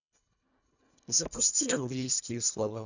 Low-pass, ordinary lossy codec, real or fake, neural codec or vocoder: 7.2 kHz; none; fake; codec, 24 kHz, 1.5 kbps, HILCodec